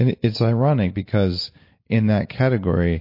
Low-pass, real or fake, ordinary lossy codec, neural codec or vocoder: 5.4 kHz; real; MP3, 32 kbps; none